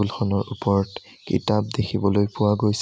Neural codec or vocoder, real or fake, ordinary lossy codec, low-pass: none; real; none; none